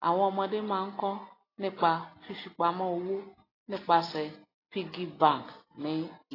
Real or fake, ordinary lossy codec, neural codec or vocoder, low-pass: real; AAC, 24 kbps; none; 5.4 kHz